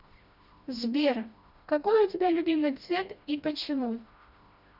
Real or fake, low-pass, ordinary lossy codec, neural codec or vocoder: fake; 5.4 kHz; Opus, 64 kbps; codec, 16 kHz, 1 kbps, FreqCodec, smaller model